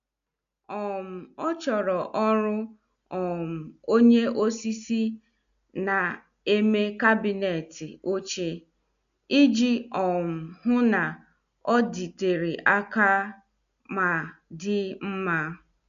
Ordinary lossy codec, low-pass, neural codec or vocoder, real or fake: none; 7.2 kHz; none; real